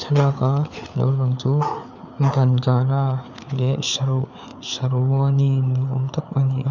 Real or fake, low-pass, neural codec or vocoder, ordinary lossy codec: fake; 7.2 kHz; codec, 16 kHz, 4 kbps, FreqCodec, larger model; none